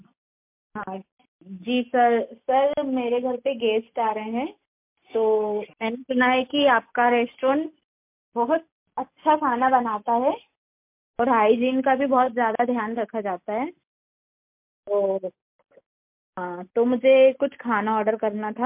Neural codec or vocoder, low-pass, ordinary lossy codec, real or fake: none; 3.6 kHz; MP3, 32 kbps; real